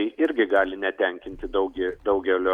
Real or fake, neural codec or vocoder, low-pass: real; none; 19.8 kHz